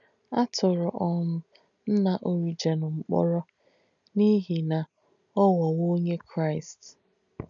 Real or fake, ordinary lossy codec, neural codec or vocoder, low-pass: real; none; none; 7.2 kHz